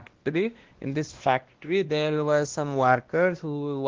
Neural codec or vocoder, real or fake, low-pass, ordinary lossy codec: codec, 16 kHz, 1 kbps, X-Codec, WavLM features, trained on Multilingual LibriSpeech; fake; 7.2 kHz; Opus, 16 kbps